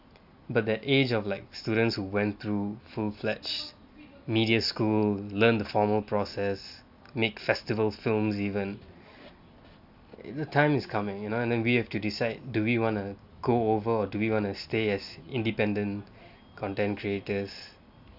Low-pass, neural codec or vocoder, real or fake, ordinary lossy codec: 5.4 kHz; none; real; none